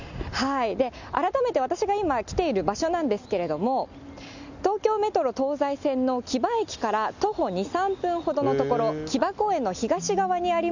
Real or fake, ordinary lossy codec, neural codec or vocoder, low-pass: real; none; none; 7.2 kHz